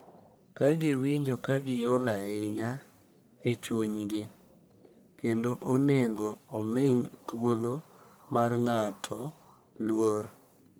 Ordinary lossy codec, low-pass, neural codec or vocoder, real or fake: none; none; codec, 44.1 kHz, 1.7 kbps, Pupu-Codec; fake